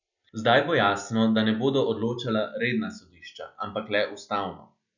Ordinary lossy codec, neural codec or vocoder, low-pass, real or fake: none; none; 7.2 kHz; real